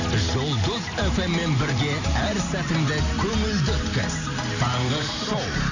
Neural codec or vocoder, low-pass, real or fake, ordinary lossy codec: none; 7.2 kHz; real; none